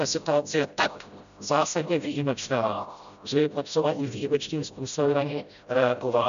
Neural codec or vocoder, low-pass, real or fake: codec, 16 kHz, 0.5 kbps, FreqCodec, smaller model; 7.2 kHz; fake